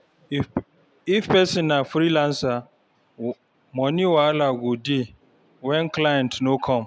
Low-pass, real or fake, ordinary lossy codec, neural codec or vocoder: none; real; none; none